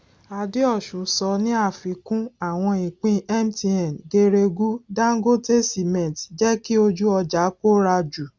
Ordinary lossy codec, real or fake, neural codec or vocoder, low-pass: none; real; none; none